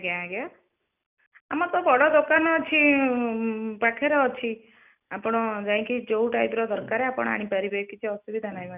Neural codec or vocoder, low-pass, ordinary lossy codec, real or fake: none; 3.6 kHz; none; real